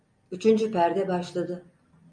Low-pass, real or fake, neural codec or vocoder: 9.9 kHz; real; none